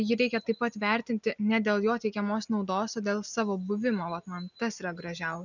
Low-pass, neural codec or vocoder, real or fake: 7.2 kHz; none; real